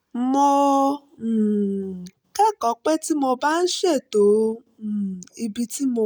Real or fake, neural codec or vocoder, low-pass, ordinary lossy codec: real; none; none; none